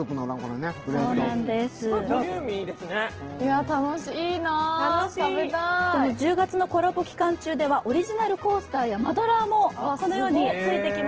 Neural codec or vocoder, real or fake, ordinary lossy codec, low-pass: none; real; Opus, 16 kbps; 7.2 kHz